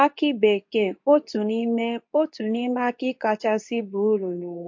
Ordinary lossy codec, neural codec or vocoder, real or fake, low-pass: MP3, 48 kbps; codec, 24 kHz, 0.9 kbps, WavTokenizer, medium speech release version 2; fake; 7.2 kHz